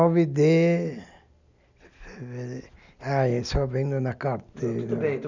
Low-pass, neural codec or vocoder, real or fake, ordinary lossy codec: 7.2 kHz; none; real; none